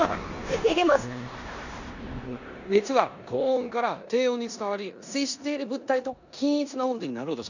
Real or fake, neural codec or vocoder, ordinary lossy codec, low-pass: fake; codec, 16 kHz in and 24 kHz out, 0.9 kbps, LongCat-Audio-Codec, four codebook decoder; none; 7.2 kHz